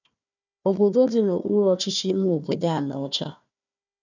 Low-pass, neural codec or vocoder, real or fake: 7.2 kHz; codec, 16 kHz, 1 kbps, FunCodec, trained on Chinese and English, 50 frames a second; fake